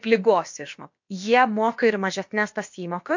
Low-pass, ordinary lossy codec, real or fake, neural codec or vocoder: 7.2 kHz; MP3, 48 kbps; fake; codec, 16 kHz, about 1 kbps, DyCAST, with the encoder's durations